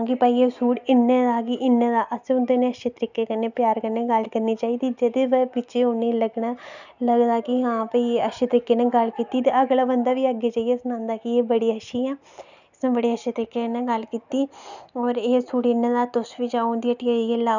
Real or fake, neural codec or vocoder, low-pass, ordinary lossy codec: real; none; 7.2 kHz; none